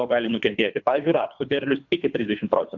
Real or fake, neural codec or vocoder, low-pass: fake; codec, 24 kHz, 3 kbps, HILCodec; 7.2 kHz